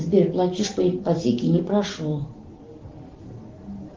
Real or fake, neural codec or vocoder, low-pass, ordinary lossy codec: real; none; 7.2 kHz; Opus, 16 kbps